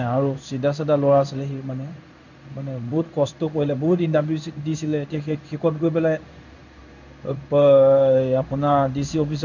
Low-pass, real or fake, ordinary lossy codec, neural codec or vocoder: 7.2 kHz; fake; none; codec, 16 kHz in and 24 kHz out, 1 kbps, XY-Tokenizer